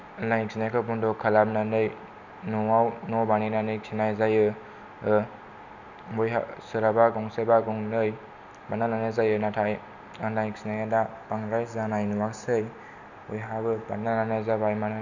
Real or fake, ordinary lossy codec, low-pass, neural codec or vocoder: real; none; 7.2 kHz; none